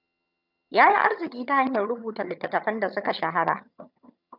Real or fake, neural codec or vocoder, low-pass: fake; vocoder, 22.05 kHz, 80 mel bands, HiFi-GAN; 5.4 kHz